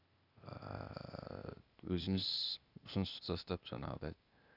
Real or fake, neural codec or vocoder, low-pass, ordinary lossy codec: fake; codec, 16 kHz, 0.8 kbps, ZipCodec; 5.4 kHz; Opus, 64 kbps